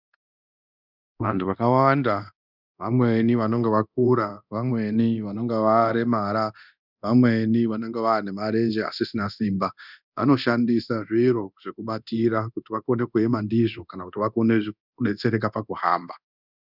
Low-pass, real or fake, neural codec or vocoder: 5.4 kHz; fake; codec, 24 kHz, 0.9 kbps, DualCodec